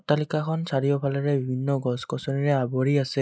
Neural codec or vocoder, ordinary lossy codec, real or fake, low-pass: none; none; real; none